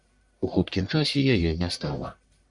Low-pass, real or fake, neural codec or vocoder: 10.8 kHz; fake; codec, 44.1 kHz, 1.7 kbps, Pupu-Codec